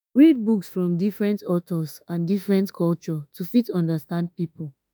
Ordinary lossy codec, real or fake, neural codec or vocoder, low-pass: none; fake; autoencoder, 48 kHz, 32 numbers a frame, DAC-VAE, trained on Japanese speech; none